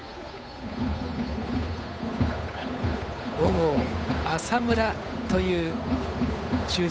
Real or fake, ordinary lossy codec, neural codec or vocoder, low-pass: fake; none; codec, 16 kHz, 8 kbps, FunCodec, trained on Chinese and English, 25 frames a second; none